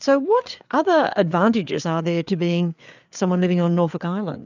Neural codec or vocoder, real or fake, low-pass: codec, 16 kHz, 4 kbps, FreqCodec, larger model; fake; 7.2 kHz